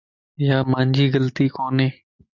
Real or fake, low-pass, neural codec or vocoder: real; 7.2 kHz; none